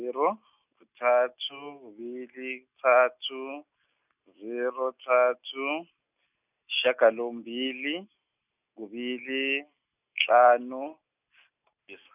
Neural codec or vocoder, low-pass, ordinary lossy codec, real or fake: none; 3.6 kHz; none; real